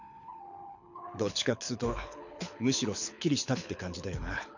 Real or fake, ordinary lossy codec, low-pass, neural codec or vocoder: fake; none; 7.2 kHz; codec, 24 kHz, 6 kbps, HILCodec